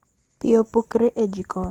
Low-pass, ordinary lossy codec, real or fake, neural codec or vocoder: 19.8 kHz; Opus, 16 kbps; real; none